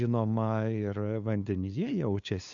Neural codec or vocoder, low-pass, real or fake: codec, 16 kHz, 2 kbps, FunCodec, trained on LibriTTS, 25 frames a second; 7.2 kHz; fake